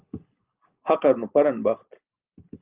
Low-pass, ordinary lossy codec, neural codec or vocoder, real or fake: 3.6 kHz; Opus, 32 kbps; none; real